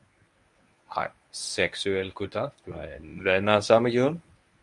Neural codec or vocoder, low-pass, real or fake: codec, 24 kHz, 0.9 kbps, WavTokenizer, medium speech release version 1; 10.8 kHz; fake